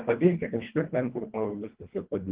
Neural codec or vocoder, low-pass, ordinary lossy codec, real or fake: codec, 24 kHz, 1.5 kbps, HILCodec; 3.6 kHz; Opus, 16 kbps; fake